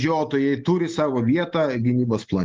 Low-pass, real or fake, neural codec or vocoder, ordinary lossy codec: 7.2 kHz; real; none; Opus, 32 kbps